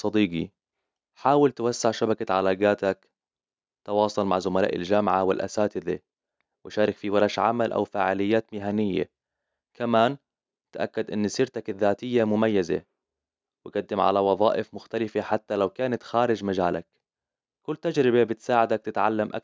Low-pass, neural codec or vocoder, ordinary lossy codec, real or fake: none; none; none; real